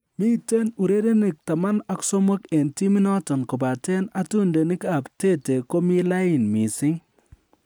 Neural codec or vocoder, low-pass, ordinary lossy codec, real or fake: none; none; none; real